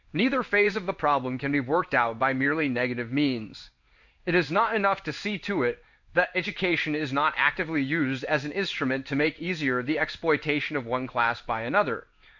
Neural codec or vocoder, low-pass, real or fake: codec, 16 kHz in and 24 kHz out, 1 kbps, XY-Tokenizer; 7.2 kHz; fake